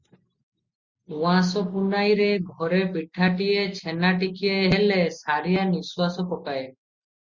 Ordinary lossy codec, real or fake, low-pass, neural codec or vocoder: Opus, 64 kbps; real; 7.2 kHz; none